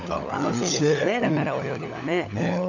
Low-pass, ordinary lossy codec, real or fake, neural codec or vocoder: 7.2 kHz; none; fake; codec, 16 kHz, 16 kbps, FunCodec, trained on LibriTTS, 50 frames a second